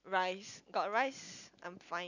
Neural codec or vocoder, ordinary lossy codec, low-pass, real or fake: none; none; 7.2 kHz; real